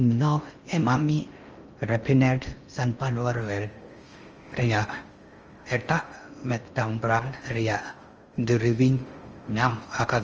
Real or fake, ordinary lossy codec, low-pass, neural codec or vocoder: fake; Opus, 24 kbps; 7.2 kHz; codec, 16 kHz in and 24 kHz out, 0.6 kbps, FocalCodec, streaming, 2048 codes